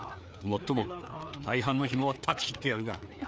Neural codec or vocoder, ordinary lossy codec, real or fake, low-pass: codec, 16 kHz, 4 kbps, FreqCodec, larger model; none; fake; none